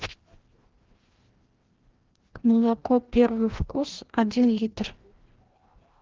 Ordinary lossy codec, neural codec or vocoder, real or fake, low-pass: Opus, 16 kbps; codec, 16 kHz, 1 kbps, FreqCodec, larger model; fake; 7.2 kHz